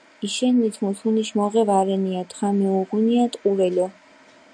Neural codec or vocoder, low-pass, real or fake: none; 9.9 kHz; real